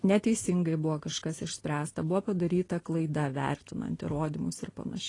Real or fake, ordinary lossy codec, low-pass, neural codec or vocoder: real; AAC, 32 kbps; 10.8 kHz; none